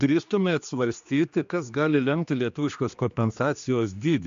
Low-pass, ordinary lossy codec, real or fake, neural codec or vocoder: 7.2 kHz; AAC, 64 kbps; fake; codec, 16 kHz, 2 kbps, X-Codec, HuBERT features, trained on general audio